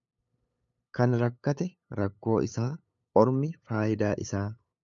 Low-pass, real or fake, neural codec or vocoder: 7.2 kHz; fake; codec, 16 kHz, 8 kbps, FunCodec, trained on LibriTTS, 25 frames a second